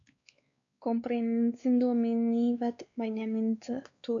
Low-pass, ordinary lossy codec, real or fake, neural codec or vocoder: 7.2 kHz; AAC, 64 kbps; fake; codec, 16 kHz, 2 kbps, X-Codec, WavLM features, trained on Multilingual LibriSpeech